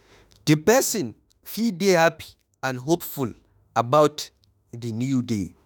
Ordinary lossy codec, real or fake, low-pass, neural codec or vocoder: none; fake; none; autoencoder, 48 kHz, 32 numbers a frame, DAC-VAE, trained on Japanese speech